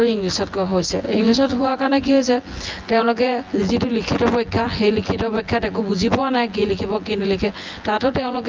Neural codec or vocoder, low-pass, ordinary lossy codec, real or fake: vocoder, 24 kHz, 100 mel bands, Vocos; 7.2 kHz; Opus, 24 kbps; fake